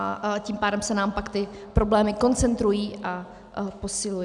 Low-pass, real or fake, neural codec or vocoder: 10.8 kHz; real; none